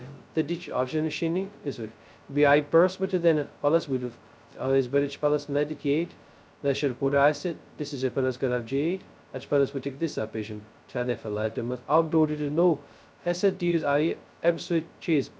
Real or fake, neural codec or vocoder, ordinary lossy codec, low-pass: fake; codec, 16 kHz, 0.2 kbps, FocalCodec; none; none